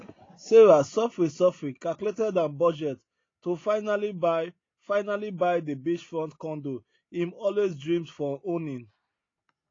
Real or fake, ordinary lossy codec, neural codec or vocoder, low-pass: real; AAC, 32 kbps; none; 7.2 kHz